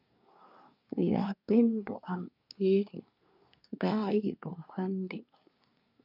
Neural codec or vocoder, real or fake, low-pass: codec, 24 kHz, 1 kbps, SNAC; fake; 5.4 kHz